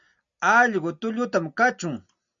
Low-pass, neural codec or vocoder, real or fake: 7.2 kHz; none; real